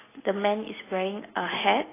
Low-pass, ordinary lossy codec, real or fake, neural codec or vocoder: 3.6 kHz; AAC, 16 kbps; real; none